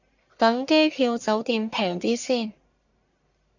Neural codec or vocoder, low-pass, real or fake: codec, 44.1 kHz, 1.7 kbps, Pupu-Codec; 7.2 kHz; fake